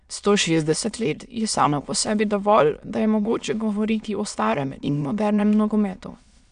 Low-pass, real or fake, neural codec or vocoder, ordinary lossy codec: 9.9 kHz; fake; autoencoder, 22.05 kHz, a latent of 192 numbers a frame, VITS, trained on many speakers; none